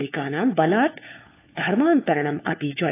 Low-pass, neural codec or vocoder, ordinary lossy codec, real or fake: 3.6 kHz; codec, 16 kHz, 4 kbps, FunCodec, trained on LibriTTS, 50 frames a second; AAC, 24 kbps; fake